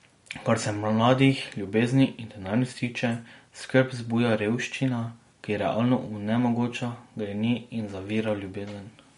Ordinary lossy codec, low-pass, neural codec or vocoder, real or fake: MP3, 48 kbps; 10.8 kHz; none; real